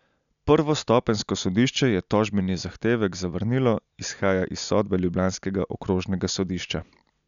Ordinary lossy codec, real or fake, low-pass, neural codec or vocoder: none; real; 7.2 kHz; none